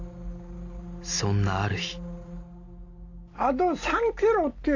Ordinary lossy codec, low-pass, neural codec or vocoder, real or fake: AAC, 48 kbps; 7.2 kHz; none; real